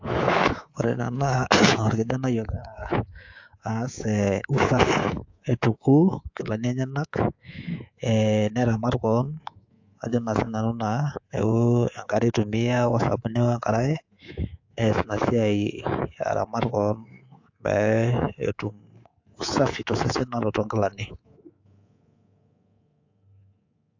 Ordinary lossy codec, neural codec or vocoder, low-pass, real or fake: AAC, 48 kbps; codec, 24 kHz, 3.1 kbps, DualCodec; 7.2 kHz; fake